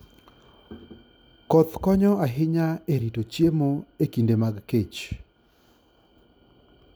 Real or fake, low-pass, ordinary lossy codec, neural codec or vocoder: real; none; none; none